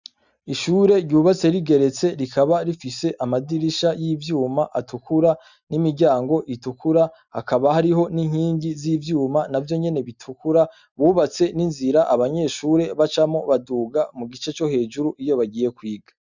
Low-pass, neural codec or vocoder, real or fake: 7.2 kHz; none; real